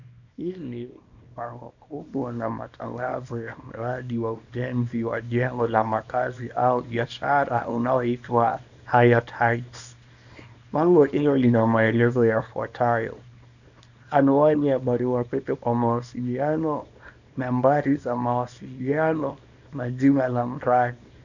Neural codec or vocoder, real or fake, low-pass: codec, 24 kHz, 0.9 kbps, WavTokenizer, small release; fake; 7.2 kHz